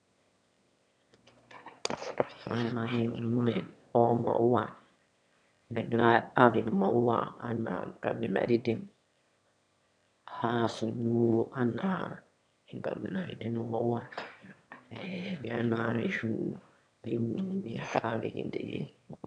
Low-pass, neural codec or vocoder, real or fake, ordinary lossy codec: 9.9 kHz; autoencoder, 22.05 kHz, a latent of 192 numbers a frame, VITS, trained on one speaker; fake; none